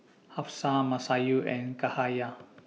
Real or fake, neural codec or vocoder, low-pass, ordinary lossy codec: real; none; none; none